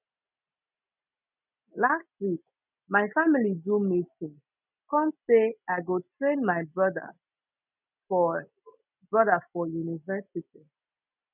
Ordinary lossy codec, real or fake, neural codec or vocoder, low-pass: none; real; none; 3.6 kHz